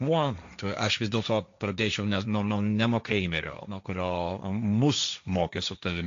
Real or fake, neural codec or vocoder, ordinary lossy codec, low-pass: fake; codec, 16 kHz, 1.1 kbps, Voila-Tokenizer; AAC, 64 kbps; 7.2 kHz